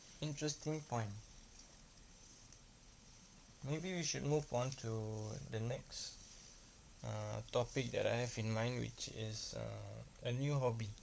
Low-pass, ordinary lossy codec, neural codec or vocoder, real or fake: none; none; codec, 16 kHz, 16 kbps, FunCodec, trained on LibriTTS, 50 frames a second; fake